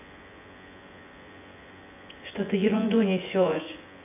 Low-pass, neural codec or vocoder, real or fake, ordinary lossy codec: 3.6 kHz; vocoder, 24 kHz, 100 mel bands, Vocos; fake; AAC, 32 kbps